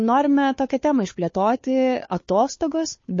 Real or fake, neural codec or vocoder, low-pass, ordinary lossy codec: fake; codec, 16 kHz, 2 kbps, FunCodec, trained on Chinese and English, 25 frames a second; 7.2 kHz; MP3, 32 kbps